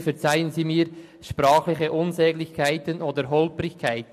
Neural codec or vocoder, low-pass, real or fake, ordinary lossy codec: none; 14.4 kHz; real; MP3, 64 kbps